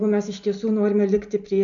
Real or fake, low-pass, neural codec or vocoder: real; 7.2 kHz; none